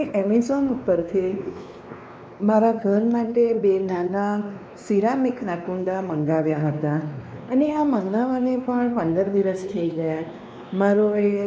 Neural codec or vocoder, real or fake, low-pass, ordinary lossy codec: codec, 16 kHz, 2 kbps, X-Codec, WavLM features, trained on Multilingual LibriSpeech; fake; none; none